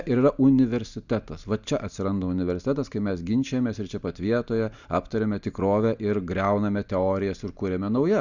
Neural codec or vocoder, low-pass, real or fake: none; 7.2 kHz; real